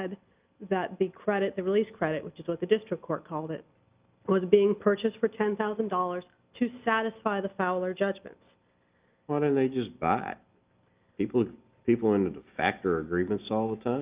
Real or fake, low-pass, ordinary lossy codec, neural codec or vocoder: real; 3.6 kHz; Opus, 24 kbps; none